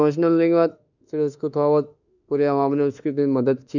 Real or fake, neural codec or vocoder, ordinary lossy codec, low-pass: fake; autoencoder, 48 kHz, 32 numbers a frame, DAC-VAE, trained on Japanese speech; none; 7.2 kHz